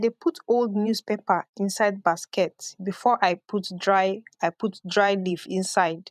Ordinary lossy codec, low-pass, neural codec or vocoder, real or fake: none; 14.4 kHz; vocoder, 44.1 kHz, 128 mel bands every 512 samples, BigVGAN v2; fake